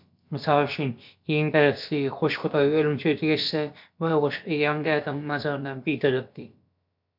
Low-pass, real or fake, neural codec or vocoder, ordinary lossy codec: 5.4 kHz; fake; codec, 16 kHz, about 1 kbps, DyCAST, with the encoder's durations; MP3, 48 kbps